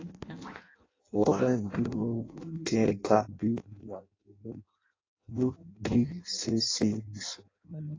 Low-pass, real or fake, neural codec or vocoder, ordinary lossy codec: 7.2 kHz; fake; codec, 16 kHz in and 24 kHz out, 0.6 kbps, FireRedTTS-2 codec; AAC, 32 kbps